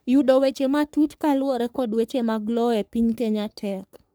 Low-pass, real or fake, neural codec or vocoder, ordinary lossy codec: none; fake; codec, 44.1 kHz, 3.4 kbps, Pupu-Codec; none